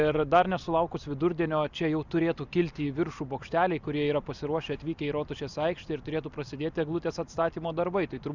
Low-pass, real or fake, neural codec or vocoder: 7.2 kHz; real; none